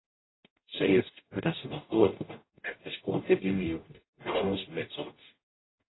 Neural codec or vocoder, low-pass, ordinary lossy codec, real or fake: codec, 44.1 kHz, 0.9 kbps, DAC; 7.2 kHz; AAC, 16 kbps; fake